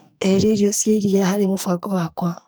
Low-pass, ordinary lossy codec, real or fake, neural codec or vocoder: none; none; fake; codec, 44.1 kHz, 2.6 kbps, SNAC